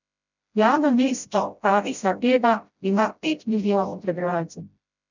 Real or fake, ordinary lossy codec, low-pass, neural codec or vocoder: fake; none; 7.2 kHz; codec, 16 kHz, 0.5 kbps, FreqCodec, smaller model